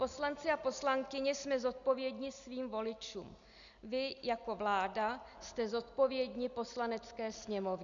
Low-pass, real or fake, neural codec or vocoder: 7.2 kHz; real; none